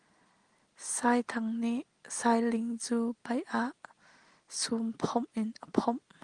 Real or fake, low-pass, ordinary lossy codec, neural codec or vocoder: real; 9.9 kHz; Opus, 24 kbps; none